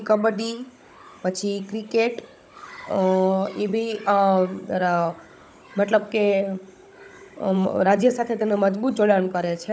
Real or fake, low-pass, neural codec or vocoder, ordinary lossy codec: fake; none; codec, 16 kHz, 16 kbps, FunCodec, trained on Chinese and English, 50 frames a second; none